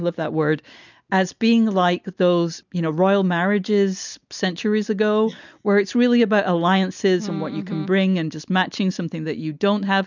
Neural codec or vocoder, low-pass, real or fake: none; 7.2 kHz; real